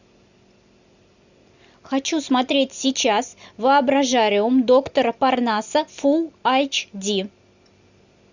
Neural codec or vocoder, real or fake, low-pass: none; real; 7.2 kHz